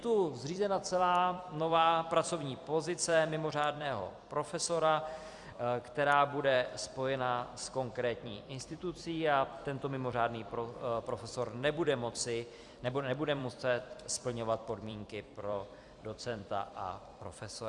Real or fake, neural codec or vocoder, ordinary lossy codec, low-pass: fake; vocoder, 44.1 kHz, 128 mel bands every 256 samples, BigVGAN v2; Opus, 64 kbps; 10.8 kHz